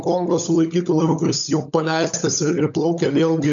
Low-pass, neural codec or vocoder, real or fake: 7.2 kHz; codec, 16 kHz, 16 kbps, FunCodec, trained on LibriTTS, 50 frames a second; fake